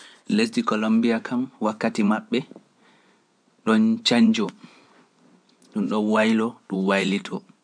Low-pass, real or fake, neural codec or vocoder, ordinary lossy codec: 9.9 kHz; fake; vocoder, 24 kHz, 100 mel bands, Vocos; MP3, 96 kbps